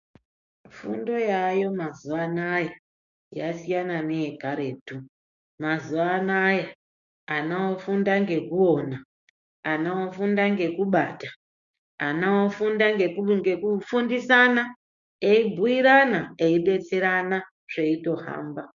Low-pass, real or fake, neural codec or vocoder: 7.2 kHz; fake; codec, 16 kHz, 6 kbps, DAC